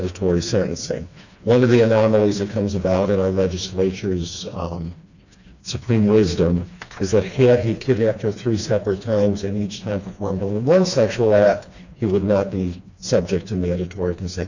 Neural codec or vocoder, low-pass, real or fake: codec, 16 kHz, 2 kbps, FreqCodec, smaller model; 7.2 kHz; fake